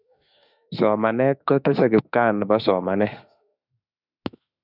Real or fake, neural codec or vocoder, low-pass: fake; autoencoder, 48 kHz, 32 numbers a frame, DAC-VAE, trained on Japanese speech; 5.4 kHz